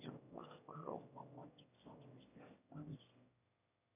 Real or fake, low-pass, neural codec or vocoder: fake; 3.6 kHz; autoencoder, 22.05 kHz, a latent of 192 numbers a frame, VITS, trained on one speaker